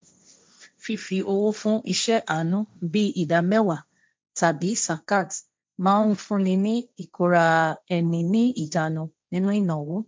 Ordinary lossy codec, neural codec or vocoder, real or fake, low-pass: none; codec, 16 kHz, 1.1 kbps, Voila-Tokenizer; fake; none